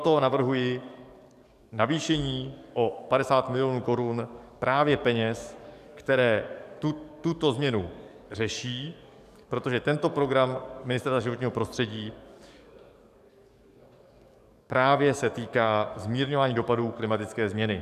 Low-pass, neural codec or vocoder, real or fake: 14.4 kHz; codec, 44.1 kHz, 7.8 kbps, DAC; fake